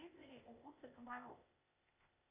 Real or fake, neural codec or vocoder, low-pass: fake; codec, 16 kHz, 0.8 kbps, ZipCodec; 3.6 kHz